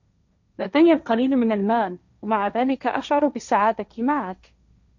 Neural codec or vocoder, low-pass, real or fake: codec, 16 kHz, 1.1 kbps, Voila-Tokenizer; 7.2 kHz; fake